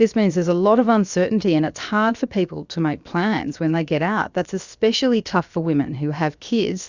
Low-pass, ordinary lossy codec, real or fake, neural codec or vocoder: 7.2 kHz; Opus, 64 kbps; fake; codec, 16 kHz, about 1 kbps, DyCAST, with the encoder's durations